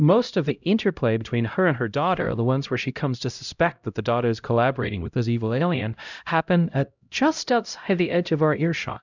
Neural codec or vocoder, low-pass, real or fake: codec, 16 kHz, 0.5 kbps, X-Codec, HuBERT features, trained on LibriSpeech; 7.2 kHz; fake